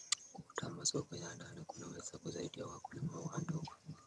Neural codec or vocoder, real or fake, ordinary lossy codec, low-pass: vocoder, 22.05 kHz, 80 mel bands, HiFi-GAN; fake; none; none